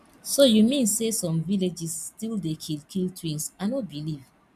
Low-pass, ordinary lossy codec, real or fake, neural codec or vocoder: 14.4 kHz; MP3, 96 kbps; real; none